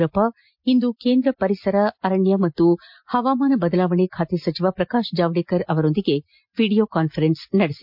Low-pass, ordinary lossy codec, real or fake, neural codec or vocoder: 5.4 kHz; MP3, 48 kbps; real; none